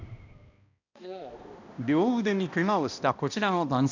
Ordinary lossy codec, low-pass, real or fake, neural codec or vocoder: none; 7.2 kHz; fake; codec, 16 kHz, 1 kbps, X-Codec, HuBERT features, trained on balanced general audio